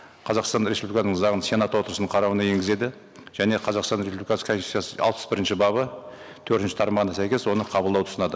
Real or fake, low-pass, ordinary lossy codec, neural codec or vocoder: real; none; none; none